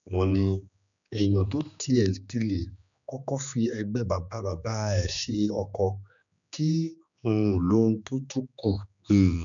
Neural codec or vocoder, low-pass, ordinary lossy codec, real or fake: codec, 16 kHz, 2 kbps, X-Codec, HuBERT features, trained on general audio; 7.2 kHz; none; fake